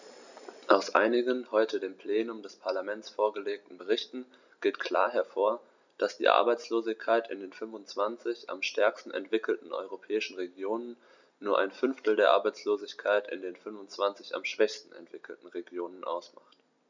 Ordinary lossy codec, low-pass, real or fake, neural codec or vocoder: none; 7.2 kHz; real; none